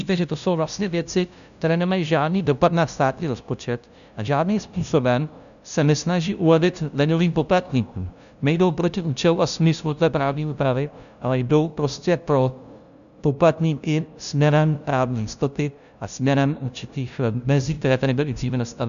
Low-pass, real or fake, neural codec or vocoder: 7.2 kHz; fake; codec, 16 kHz, 0.5 kbps, FunCodec, trained on LibriTTS, 25 frames a second